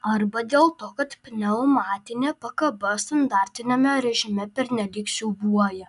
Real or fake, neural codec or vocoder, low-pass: real; none; 10.8 kHz